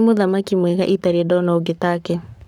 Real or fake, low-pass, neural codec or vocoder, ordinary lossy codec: fake; 19.8 kHz; codec, 44.1 kHz, 7.8 kbps, Pupu-Codec; none